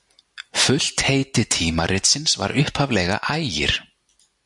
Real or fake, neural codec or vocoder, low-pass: real; none; 10.8 kHz